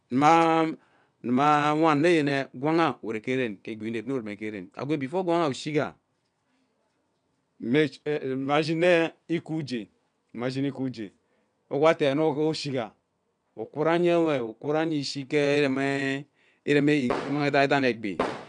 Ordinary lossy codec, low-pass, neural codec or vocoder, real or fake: none; 9.9 kHz; vocoder, 22.05 kHz, 80 mel bands, WaveNeXt; fake